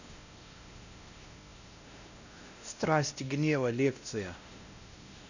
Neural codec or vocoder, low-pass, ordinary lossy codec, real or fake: codec, 16 kHz, 1 kbps, X-Codec, WavLM features, trained on Multilingual LibriSpeech; 7.2 kHz; none; fake